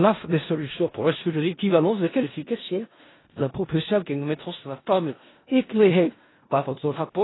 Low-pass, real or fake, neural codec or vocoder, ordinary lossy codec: 7.2 kHz; fake; codec, 16 kHz in and 24 kHz out, 0.4 kbps, LongCat-Audio-Codec, four codebook decoder; AAC, 16 kbps